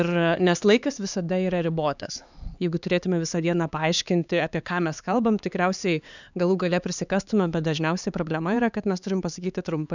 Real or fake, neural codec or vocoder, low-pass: fake; codec, 16 kHz, 4 kbps, X-Codec, HuBERT features, trained on LibriSpeech; 7.2 kHz